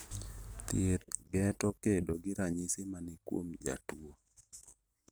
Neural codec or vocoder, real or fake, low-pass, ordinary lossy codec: none; real; none; none